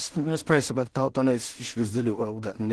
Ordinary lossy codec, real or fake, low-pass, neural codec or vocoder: Opus, 16 kbps; fake; 10.8 kHz; codec, 16 kHz in and 24 kHz out, 0.4 kbps, LongCat-Audio-Codec, two codebook decoder